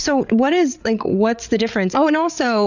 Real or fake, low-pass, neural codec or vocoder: fake; 7.2 kHz; codec, 16 kHz, 8 kbps, FreqCodec, larger model